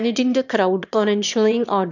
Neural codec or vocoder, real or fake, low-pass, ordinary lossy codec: autoencoder, 22.05 kHz, a latent of 192 numbers a frame, VITS, trained on one speaker; fake; 7.2 kHz; none